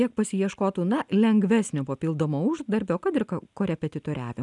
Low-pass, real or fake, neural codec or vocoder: 10.8 kHz; real; none